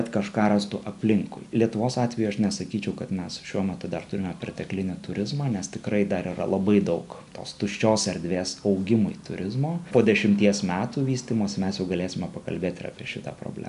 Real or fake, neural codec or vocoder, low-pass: real; none; 10.8 kHz